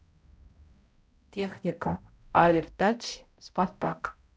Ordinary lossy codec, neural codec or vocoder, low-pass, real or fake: none; codec, 16 kHz, 0.5 kbps, X-Codec, HuBERT features, trained on balanced general audio; none; fake